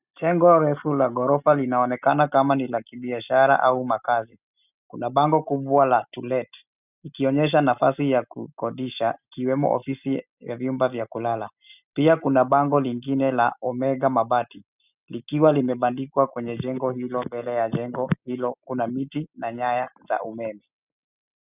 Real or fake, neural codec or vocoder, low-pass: real; none; 3.6 kHz